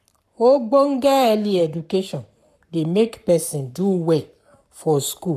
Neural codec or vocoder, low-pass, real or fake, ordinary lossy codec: codec, 44.1 kHz, 7.8 kbps, Pupu-Codec; 14.4 kHz; fake; none